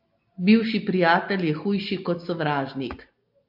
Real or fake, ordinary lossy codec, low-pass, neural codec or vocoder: real; AAC, 48 kbps; 5.4 kHz; none